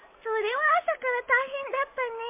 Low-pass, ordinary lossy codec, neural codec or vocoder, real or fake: 3.6 kHz; MP3, 32 kbps; none; real